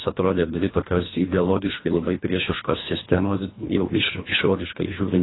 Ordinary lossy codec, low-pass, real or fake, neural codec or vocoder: AAC, 16 kbps; 7.2 kHz; fake; codec, 24 kHz, 1.5 kbps, HILCodec